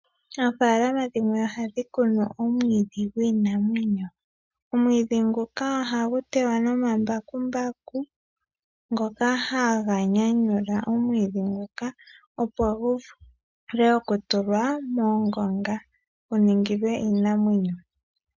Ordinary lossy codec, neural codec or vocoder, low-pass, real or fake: MP3, 64 kbps; none; 7.2 kHz; real